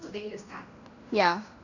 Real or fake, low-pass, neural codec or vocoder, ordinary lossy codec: fake; 7.2 kHz; codec, 16 kHz in and 24 kHz out, 0.9 kbps, LongCat-Audio-Codec, fine tuned four codebook decoder; none